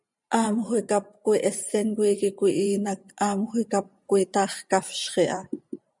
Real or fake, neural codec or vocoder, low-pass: fake; vocoder, 44.1 kHz, 128 mel bands every 512 samples, BigVGAN v2; 10.8 kHz